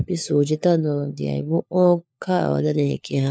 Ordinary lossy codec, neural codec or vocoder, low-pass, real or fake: none; codec, 16 kHz, 4 kbps, FunCodec, trained on LibriTTS, 50 frames a second; none; fake